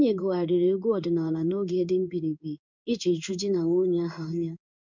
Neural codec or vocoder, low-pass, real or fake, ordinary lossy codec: codec, 16 kHz in and 24 kHz out, 1 kbps, XY-Tokenizer; 7.2 kHz; fake; MP3, 64 kbps